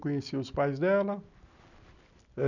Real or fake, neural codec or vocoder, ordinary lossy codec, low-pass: real; none; none; 7.2 kHz